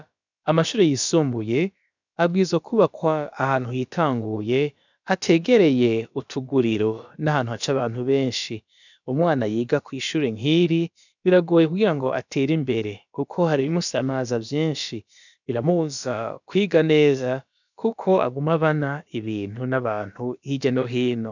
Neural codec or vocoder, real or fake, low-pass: codec, 16 kHz, about 1 kbps, DyCAST, with the encoder's durations; fake; 7.2 kHz